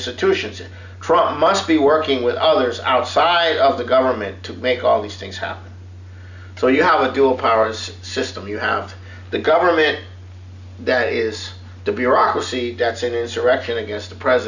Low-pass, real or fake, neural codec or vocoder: 7.2 kHz; real; none